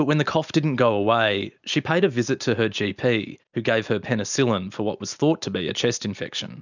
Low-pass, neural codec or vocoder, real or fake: 7.2 kHz; none; real